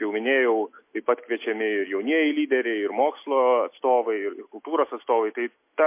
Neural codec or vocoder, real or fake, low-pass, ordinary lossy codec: none; real; 3.6 kHz; MP3, 24 kbps